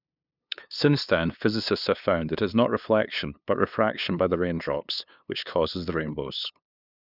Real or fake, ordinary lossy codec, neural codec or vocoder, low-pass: fake; none; codec, 16 kHz, 2 kbps, FunCodec, trained on LibriTTS, 25 frames a second; 5.4 kHz